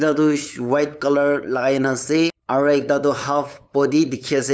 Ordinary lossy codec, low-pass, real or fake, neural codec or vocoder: none; none; fake; codec, 16 kHz, 8 kbps, FunCodec, trained on LibriTTS, 25 frames a second